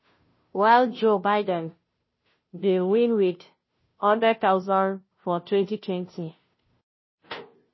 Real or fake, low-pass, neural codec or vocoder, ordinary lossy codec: fake; 7.2 kHz; codec, 16 kHz, 0.5 kbps, FunCodec, trained on Chinese and English, 25 frames a second; MP3, 24 kbps